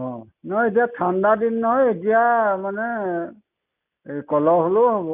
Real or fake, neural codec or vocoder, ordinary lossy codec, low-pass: real; none; none; 3.6 kHz